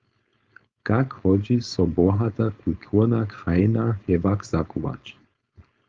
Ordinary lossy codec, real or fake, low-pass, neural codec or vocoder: Opus, 32 kbps; fake; 7.2 kHz; codec, 16 kHz, 4.8 kbps, FACodec